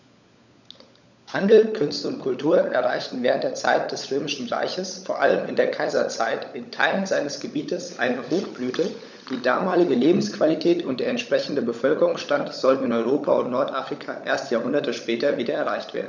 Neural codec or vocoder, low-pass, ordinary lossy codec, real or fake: codec, 16 kHz, 16 kbps, FunCodec, trained on LibriTTS, 50 frames a second; 7.2 kHz; none; fake